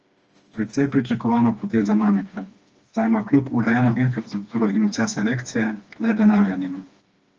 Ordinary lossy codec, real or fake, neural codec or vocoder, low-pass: Opus, 24 kbps; fake; codec, 16 kHz, 2 kbps, FreqCodec, smaller model; 7.2 kHz